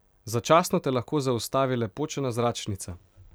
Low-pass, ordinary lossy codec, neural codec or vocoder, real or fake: none; none; none; real